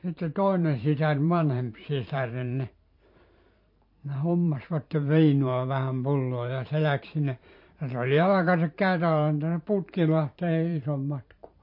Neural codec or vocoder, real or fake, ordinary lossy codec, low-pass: vocoder, 44.1 kHz, 128 mel bands every 512 samples, BigVGAN v2; fake; MP3, 32 kbps; 5.4 kHz